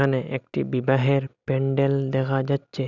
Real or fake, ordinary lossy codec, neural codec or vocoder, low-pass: real; none; none; 7.2 kHz